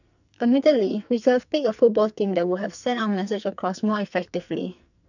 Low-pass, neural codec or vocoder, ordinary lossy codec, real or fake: 7.2 kHz; codec, 44.1 kHz, 2.6 kbps, SNAC; none; fake